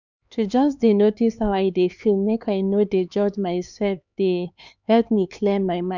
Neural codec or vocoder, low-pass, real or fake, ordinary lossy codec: codec, 16 kHz, 4 kbps, X-Codec, HuBERT features, trained on LibriSpeech; 7.2 kHz; fake; none